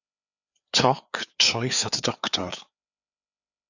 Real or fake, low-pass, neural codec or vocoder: fake; 7.2 kHz; codec, 16 kHz, 4 kbps, FreqCodec, larger model